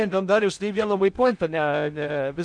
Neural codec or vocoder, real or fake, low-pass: codec, 16 kHz in and 24 kHz out, 0.6 kbps, FocalCodec, streaming, 2048 codes; fake; 9.9 kHz